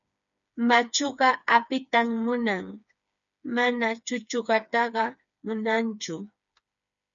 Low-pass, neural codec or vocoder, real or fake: 7.2 kHz; codec, 16 kHz, 4 kbps, FreqCodec, smaller model; fake